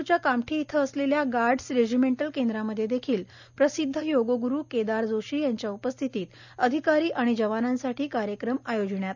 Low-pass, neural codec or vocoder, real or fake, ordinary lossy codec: 7.2 kHz; none; real; none